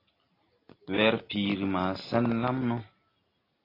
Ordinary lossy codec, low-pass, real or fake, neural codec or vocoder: AAC, 24 kbps; 5.4 kHz; real; none